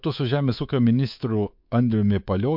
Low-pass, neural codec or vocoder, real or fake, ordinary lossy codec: 5.4 kHz; codec, 24 kHz, 3.1 kbps, DualCodec; fake; MP3, 48 kbps